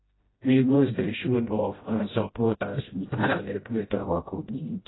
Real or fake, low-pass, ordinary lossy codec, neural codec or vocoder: fake; 7.2 kHz; AAC, 16 kbps; codec, 16 kHz, 0.5 kbps, FreqCodec, smaller model